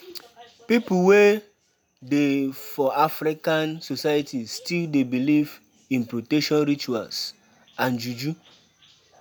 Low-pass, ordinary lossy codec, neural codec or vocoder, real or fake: none; none; none; real